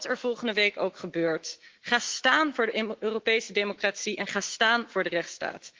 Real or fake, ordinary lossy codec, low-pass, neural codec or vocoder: fake; Opus, 16 kbps; 7.2 kHz; autoencoder, 48 kHz, 128 numbers a frame, DAC-VAE, trained on Japanese speech